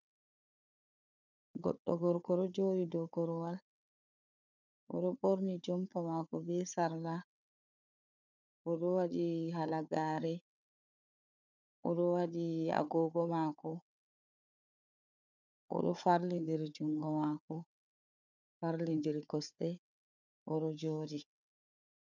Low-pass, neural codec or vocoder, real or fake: 7.2 kHz; codec, 16 kHz, 4 kbps, FunCodec, trained on Chinese and English, 50 frames a second; fake